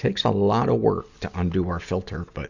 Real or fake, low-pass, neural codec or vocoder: real; 7.2 kHz; none